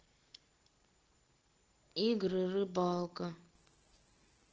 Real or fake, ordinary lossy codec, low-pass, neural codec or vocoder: real; Opus, 16 kbps; 7.2 kHz; none